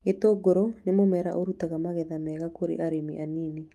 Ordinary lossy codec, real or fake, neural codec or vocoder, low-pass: Opus, 32 kbps; fake; autoencoder, 48 kHz, 128 numbers a frame, DAC-VAE, trained on Japanese speech; 14.4 kHz